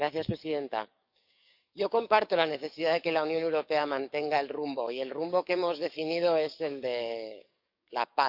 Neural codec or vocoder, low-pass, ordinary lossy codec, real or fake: codec, 44.1 kHz, 7.8 kbps, DAC; 5.4 kHz; none; fake